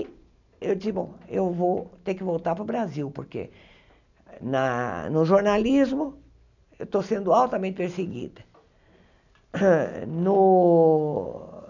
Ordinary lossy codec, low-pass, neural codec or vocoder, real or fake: none; 7.2 kHz; none; real